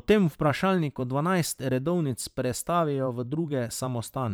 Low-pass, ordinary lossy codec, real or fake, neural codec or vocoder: none; none; fake; vocoder, 44.1 kHz, 128 mel bands every 512 samples, BigVGAN v2